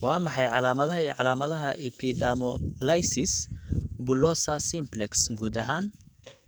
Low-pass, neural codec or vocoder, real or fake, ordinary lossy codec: none; codec, 44.1 kHz, 2.6 kbps, SNAC; fake; none